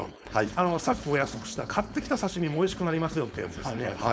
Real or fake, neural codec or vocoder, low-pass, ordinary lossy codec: fake; codec, 16 kHz, 4.8 kbps, FACodec; none; none